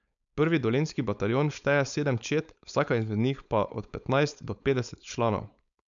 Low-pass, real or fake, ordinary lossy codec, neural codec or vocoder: 7.2 kHz; fake; none; codec, 16 kHz, 4.8 kbps, FACodec